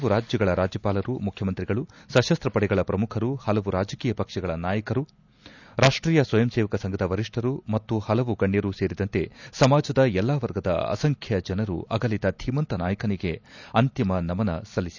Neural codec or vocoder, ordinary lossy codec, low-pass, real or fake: none; none; 7.2 kHz; real